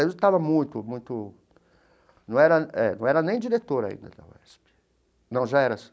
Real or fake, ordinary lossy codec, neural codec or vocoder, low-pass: real; none; none; none